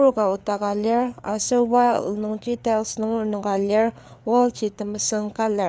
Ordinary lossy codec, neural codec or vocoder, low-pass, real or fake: none; codec, 16 kHz, 4 kbps, FreqCodec, larger model; none; fake